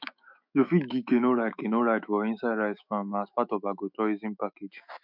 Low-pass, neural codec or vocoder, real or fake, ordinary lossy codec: 5.4 kHz; autoencoder, 48 kHz, 128 numbers a frame, DAC-VAE, trained on Japanese speech; fake; MP3, 48 kbps